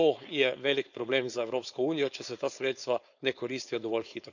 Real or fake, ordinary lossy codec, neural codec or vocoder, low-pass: fake; none; codec, 16 kHz, 4.8 kbps, FACodec; 7.2 kHz